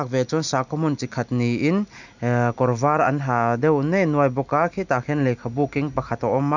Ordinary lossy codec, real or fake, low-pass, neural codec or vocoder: none; real; 7.2 kHz; none